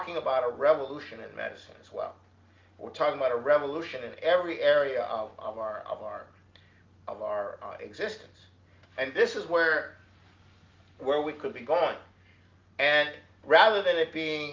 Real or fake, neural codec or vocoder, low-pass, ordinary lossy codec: real; none; 7.2 kHz; Opus, 32 kbps